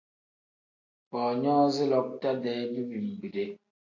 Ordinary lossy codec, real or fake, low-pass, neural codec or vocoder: AAC, 48 kbps; real; 7.2 kHz; none